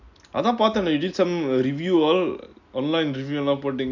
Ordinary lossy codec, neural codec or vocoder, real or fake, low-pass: none; none; real; 7.2 kHz